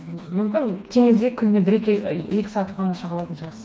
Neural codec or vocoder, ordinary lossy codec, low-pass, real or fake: codec, 16 kHz, 2 kbps, FreqCodec, smaller model; none; none; fake